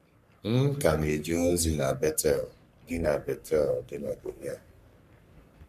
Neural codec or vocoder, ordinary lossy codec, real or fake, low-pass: codec, 44.1 kHz, 3.4 kbps, Pupu-Codec; MP3, 96 kbps; fake; 14.4 kHz